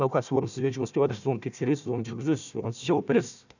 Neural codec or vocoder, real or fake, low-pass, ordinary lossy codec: codec, 16 kHz, 1 kbps, FunCodec, trained on Chinese and English, 50 frames a second; fake; 7.2 kHz; none